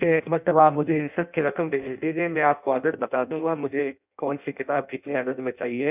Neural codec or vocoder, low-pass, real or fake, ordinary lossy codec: codec, 16 kHz in and 24 kHz out, 0.6 kbps, FireRedTTS-2 codec; 3.6 kHz; fake; none